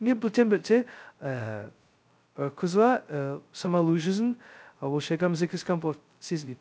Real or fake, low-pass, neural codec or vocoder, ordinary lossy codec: fake; none; codec, 16 kHz, 0.2 kbps, FocalCodec; none